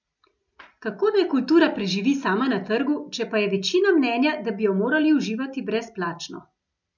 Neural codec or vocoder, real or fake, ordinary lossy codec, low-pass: none; real; none; 7.2 kHz